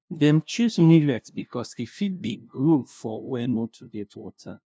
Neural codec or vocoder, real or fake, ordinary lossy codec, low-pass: codec, 16 kHz, 0.5 kbps, FunCodec, trained on LibriTTS, 25 frames a second; fake; none; none